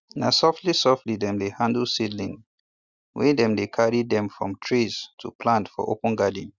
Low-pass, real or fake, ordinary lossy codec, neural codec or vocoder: 7.2 kHz; real; Opus, 64 kbps; none